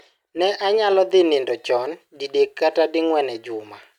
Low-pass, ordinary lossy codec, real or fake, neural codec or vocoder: 19.8 kHz; none; real; none